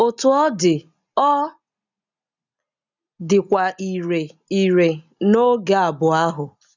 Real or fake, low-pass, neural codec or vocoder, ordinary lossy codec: real; 7.2 kHz; none; none